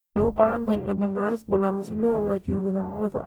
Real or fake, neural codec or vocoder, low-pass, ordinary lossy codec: fake; codec, 44.1 kHz, 0.9 kbps, DAC; none; none